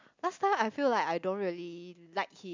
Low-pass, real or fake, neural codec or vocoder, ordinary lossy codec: 7.2 kHz; real; none; MP3, 64 kbps